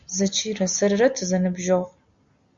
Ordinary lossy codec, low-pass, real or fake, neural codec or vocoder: Opus, 64 kbps; 7.2 kHz; real; none